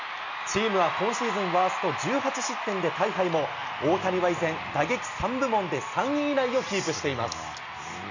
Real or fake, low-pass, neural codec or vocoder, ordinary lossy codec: real; 7.2 kHz; none; none